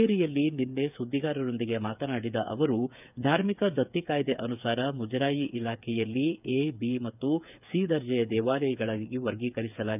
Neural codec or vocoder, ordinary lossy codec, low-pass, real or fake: codec, 16 kHz, 8 kbps, FreqCodec, smaller model; none; 3.6 kHz; fake